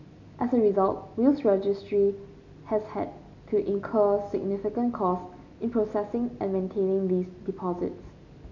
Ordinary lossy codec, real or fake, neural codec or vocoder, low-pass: none; real; none; 7.2 kHz